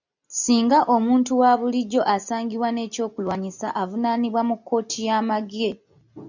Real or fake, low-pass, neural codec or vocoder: real; 7.2 kHz; none